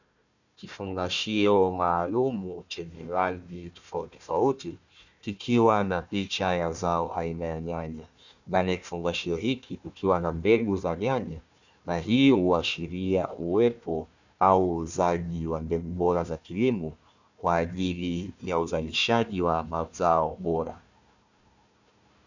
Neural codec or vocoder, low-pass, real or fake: codec, 16 kHz, 1 kbps, FunCodec, trained on Chinese and English, 50 frames a second; 7.2 kHz; fake